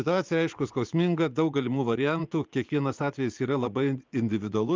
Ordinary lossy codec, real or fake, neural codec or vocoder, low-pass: Opus, 24 kbps; fake; vocoder, 24 kHz, 100 mel bands, Vocos; 7.2 kHz